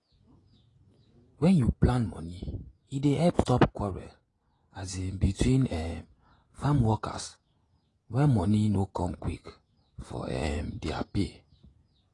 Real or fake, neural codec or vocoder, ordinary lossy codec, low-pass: fake; vocoder, 48 kHz, 128 mel bands, Vocos; AAC, 32 kbps; 10.8 kHz